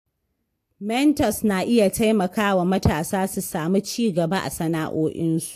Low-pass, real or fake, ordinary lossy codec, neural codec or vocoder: 14.4 kHz; real; AAC, 64 kbps; none